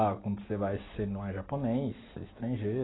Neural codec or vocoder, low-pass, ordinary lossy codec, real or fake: none; 7.2 kHz; AAC, 16 kbps; real